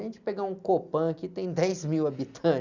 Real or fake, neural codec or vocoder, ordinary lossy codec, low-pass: real; none; Opus, 64 kbps; 7.2 kHz